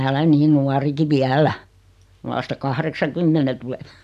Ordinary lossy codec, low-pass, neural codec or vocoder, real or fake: none; 14.4 kHz; none; real